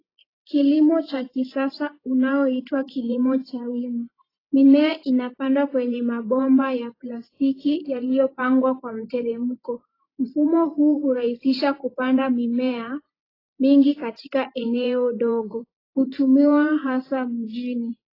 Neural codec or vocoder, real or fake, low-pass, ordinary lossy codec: vocoder, 44.1 kHz, 128 mel bands every 512 samples, BigVGAN v2; fake; 5.4 kHz; AAC, 24 kbps